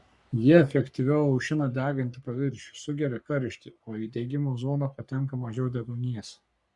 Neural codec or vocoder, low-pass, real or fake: codec, 44.1 kHz, 3.4 kbps, Pupu-Codec; 10.8 kHz; fake